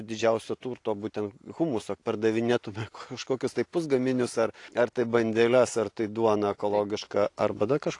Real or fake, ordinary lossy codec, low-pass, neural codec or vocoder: real; AAC, 48 kbps; 10.8 kHz; none